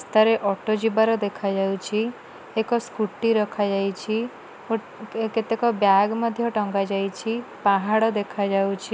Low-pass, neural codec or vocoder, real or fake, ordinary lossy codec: none; none; real; none